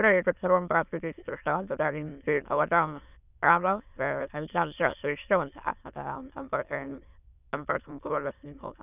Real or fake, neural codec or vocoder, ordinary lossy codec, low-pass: fake; autoencoder, 22.05 kHz, a latent of 192 numbers a frame, VITS, trained on many speakers; none; 3.6 kHz